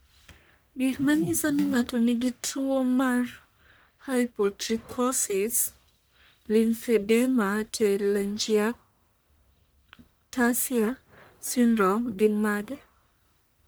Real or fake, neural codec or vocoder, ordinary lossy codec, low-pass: fake; codec, 44.1 kHz, 1.7 kbps, Pupu-Codec; none; none